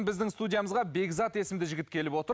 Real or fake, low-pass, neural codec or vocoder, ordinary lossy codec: real; none; none; none